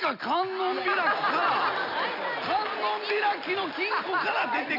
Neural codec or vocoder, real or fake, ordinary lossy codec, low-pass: none; real; none; 5.4 kHz